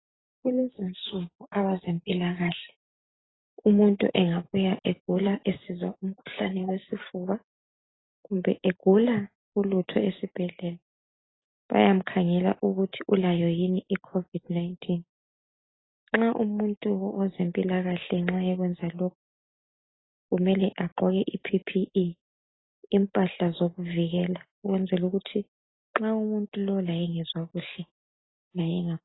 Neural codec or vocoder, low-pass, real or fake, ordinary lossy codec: none; 7.2 kHz; real; AAC, 16 kbps